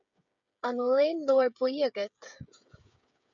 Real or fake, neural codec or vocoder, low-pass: fake; codec, 16 kHz, 8 kbps, FreqCodec, smaller model; 7.2 kHz